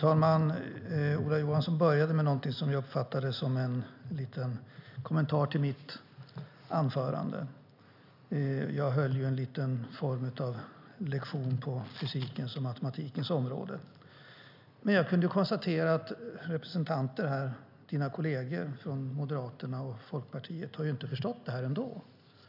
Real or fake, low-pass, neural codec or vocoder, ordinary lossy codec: real; 5.4 kHz; none; none